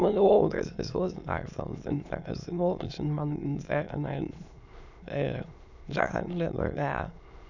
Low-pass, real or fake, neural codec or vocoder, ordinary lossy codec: 7.2 kHz; fake; autoencoder, 22.05 kHz, a latent of 192 numbers a frame, VITS, trained on many speakers; none